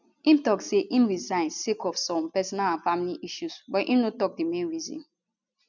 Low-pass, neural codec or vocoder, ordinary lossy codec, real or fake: 7.2 kHz; none; none; real